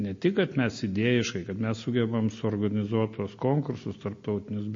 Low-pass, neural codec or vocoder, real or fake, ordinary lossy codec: 7.2 kHz; none; real; MP3, 32 kbps